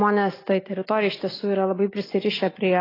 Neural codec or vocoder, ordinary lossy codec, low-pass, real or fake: none; AAC, 24 kbps; 5.4 kHz; real